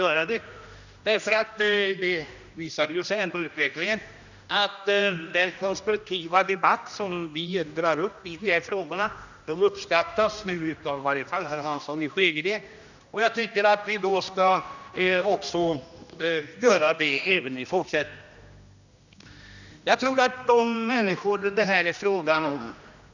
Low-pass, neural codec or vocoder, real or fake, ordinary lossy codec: 7.2 kHz; codec, 16 kHz, 1 kbps, X-Codec, HuBERT features, trained on general audio; fake; none